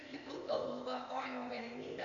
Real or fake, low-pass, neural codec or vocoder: fake; 7.2 kHz; codec, 16 kHz, 0.8 kbps, ZipCodec